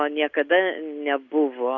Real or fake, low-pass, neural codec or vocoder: real; 7.2 kHz; none